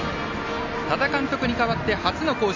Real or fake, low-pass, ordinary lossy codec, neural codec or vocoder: real; 7.2 kHz; AAC, 48 kbps; none